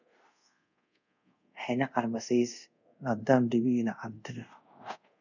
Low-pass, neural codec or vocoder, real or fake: 7.2 kHz; codec, 24 kHz, 0.5 kbps, DualCodec; fake